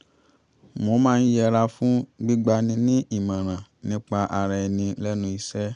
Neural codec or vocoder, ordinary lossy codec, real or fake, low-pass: none; none; real; 9.9 kHz